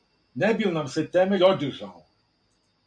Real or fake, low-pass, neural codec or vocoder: real; 9.9 kHz; none